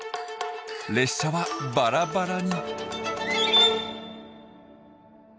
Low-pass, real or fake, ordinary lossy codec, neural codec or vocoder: none; real; none; none